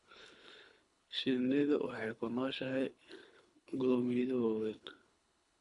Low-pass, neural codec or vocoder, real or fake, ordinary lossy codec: 10.8 kHz; codec, 24 kHz, 3 kbps, HILCodec; fake; none